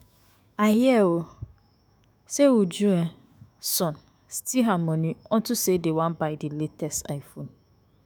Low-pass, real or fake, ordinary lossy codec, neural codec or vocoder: none; fake; none; autoencoder, 48 kHz, 128 numbers a frame, DAC-VAE, trained on Japanese speech